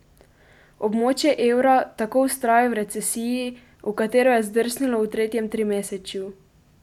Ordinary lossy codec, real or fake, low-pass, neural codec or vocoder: none; real; 19.8 kHz; none